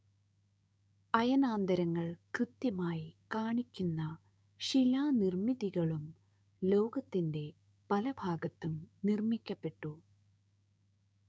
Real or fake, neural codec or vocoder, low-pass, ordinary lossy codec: fake; codec, 16 kHz, 6 kbps, DAC; none; none